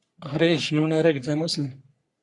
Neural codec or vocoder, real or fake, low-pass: codec, 44.1 kHz, 3.4 kbps, Pupu-Codec; fake; 10.8 kHz